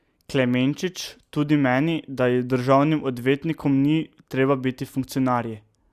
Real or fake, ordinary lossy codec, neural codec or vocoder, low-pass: real; Opus, 64 kbps; none; 14.4 kHz